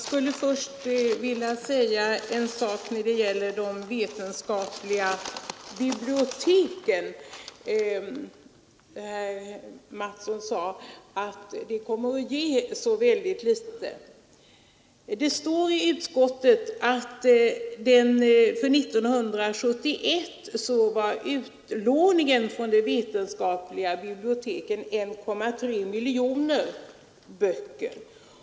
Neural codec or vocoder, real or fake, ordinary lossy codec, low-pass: none; real; none; none